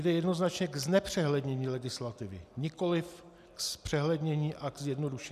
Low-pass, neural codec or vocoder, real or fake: 14.4 kHz; none; real